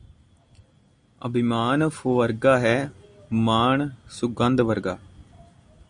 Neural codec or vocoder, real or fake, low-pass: none; real; 9.9 kHz